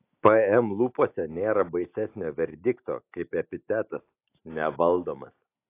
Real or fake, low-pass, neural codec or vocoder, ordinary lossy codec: real; 3.6 kHz; none; AAC, 24 kbps